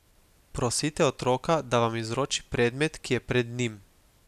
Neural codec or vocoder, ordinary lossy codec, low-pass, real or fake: none; none; 14.4 kHz; real